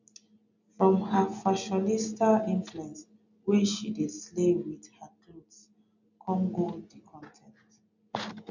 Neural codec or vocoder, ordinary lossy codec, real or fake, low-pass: none; none; real; 7.2 kHz